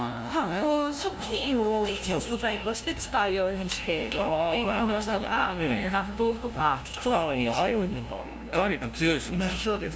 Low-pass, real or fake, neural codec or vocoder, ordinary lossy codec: none; fake; codec, 16 kHz, 0.5 kbps, FunCodec, trained on LibriTTS, 25 frames a second; none